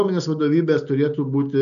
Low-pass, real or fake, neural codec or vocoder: 7.2 kHz; real; none